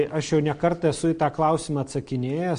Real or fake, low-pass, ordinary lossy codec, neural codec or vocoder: real; 9.9 kHz; MP3, 48 kbps; none